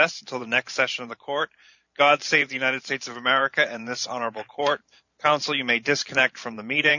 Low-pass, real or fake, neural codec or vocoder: 7.2 kHz; fake; vocoder, 44.1 kHz, 128 mel bands every 512 samples, BigVGAN v2